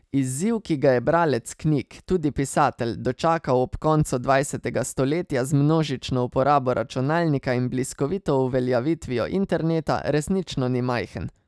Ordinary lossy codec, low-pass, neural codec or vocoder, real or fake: none; none; none; real